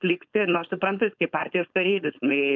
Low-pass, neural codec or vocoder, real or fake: 7.2 kHz; codec, 16 kHz, 4.8 kbps, FACodec; fake